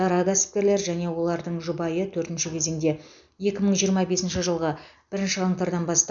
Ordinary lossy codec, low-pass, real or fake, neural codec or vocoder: none; 7.2 kHz; real; none